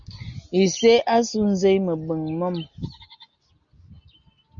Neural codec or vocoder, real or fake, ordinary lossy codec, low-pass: none; real; Opus, 64 kbps; 7.2 kHz